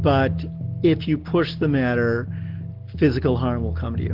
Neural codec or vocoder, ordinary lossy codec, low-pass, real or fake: none; Opus, 16 kbps; 5.4 kHz; real